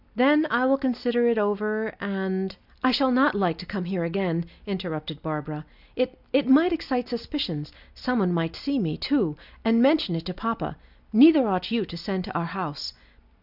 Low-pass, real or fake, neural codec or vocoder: 5.4 kHz; real; none